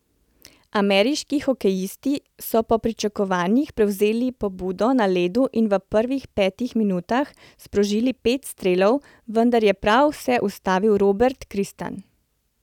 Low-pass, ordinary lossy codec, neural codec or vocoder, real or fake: 19.8 kHz; none; none; real